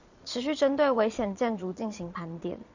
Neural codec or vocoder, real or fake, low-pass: vocoder, 22.05 kHz, 80 mel bands, Vocos; fake; 7.2 kHz